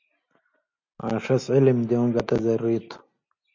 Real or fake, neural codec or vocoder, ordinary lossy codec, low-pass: real; none; MP3, 64 kbps; 7.2 kHz